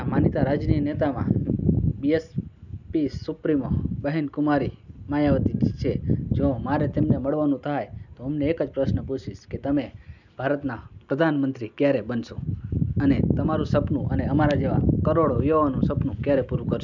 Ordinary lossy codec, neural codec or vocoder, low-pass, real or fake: none; none; 7.2 kHz; real